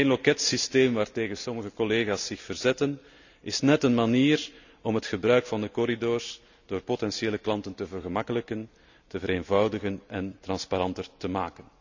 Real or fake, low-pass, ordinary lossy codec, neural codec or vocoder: real; 7.2 kHz; none; none